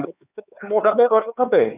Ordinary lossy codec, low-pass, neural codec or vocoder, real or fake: none; 3.6 kHz; codec, 16 kHz, 4 kbps, X-Codec, HuBERT features, trained on LibriSpeech; fake